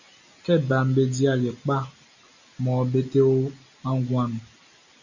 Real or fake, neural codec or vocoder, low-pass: real; none; 7.2 kHz